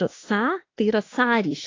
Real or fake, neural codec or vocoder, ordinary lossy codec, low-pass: fake; autoencoder, 48 kHz, 32 numbers a frame, DAC-VAE, trained on Japanese speech; AAC, 32 kbps; 7.2 kHz